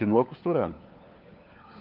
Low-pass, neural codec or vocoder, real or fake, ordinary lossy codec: 5.4 kHz; codec, 16 kHz, 4 kbps, FunCodec, trained on LibriTTS, 50 frames a second; fake; Opus, 32 kbps